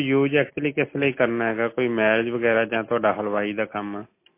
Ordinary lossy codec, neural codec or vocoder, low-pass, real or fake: MP3, 24 kbps; none; 3.6 kHz; real